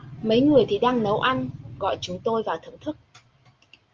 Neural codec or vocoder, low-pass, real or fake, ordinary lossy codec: none; 7.2 kHz; real; Opus, 24 kbps